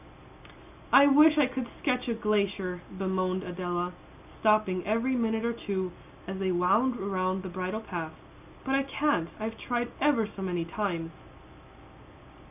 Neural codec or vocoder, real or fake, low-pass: none; real; 3.6 kHz